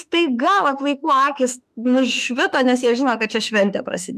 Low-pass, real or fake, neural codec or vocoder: 14.4 kHz; fake; autoencoder, 48 kHz, 32 numbers a frame, DAC-VAE, trained on Japanese speech